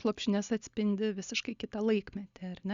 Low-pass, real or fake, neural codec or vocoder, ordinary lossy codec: 7.2 kHz; real; none; Opus, 64 kbps